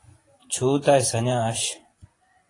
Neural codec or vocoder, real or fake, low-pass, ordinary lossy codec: vocoder, 24 kHz, 100 mel bands, Vocos; fake; 10.8 kHz; AAC, 32 kbps